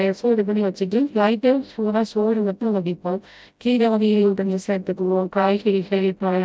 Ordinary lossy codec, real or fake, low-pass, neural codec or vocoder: none; fake; none; codec, 16 kHz, 0.5 kbps, FreqCodec, smaller model